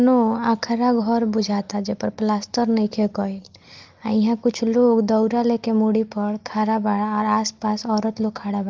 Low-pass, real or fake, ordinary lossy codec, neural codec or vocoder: 7.2 kHz; real; Opus, 24 kbps; none